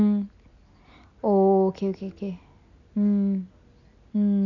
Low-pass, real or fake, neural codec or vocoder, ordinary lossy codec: 7.2 kHz; real; none; none